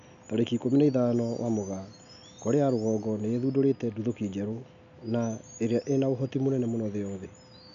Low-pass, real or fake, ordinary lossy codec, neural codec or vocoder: 7.2 kHz; real; none; none